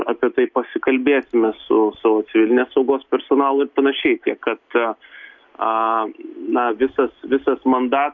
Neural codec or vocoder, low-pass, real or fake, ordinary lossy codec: none; 7.2 kHz; real; MP3, 64 kbps